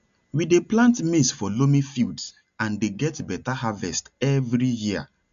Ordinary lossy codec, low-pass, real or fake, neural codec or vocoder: none; 7.2 kHz; real; none